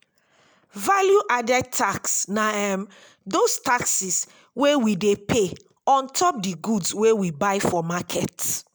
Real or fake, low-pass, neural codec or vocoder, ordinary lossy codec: real; none; none; none